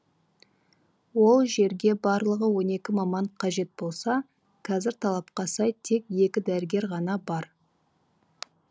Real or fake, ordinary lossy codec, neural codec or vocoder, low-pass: real; none; none; none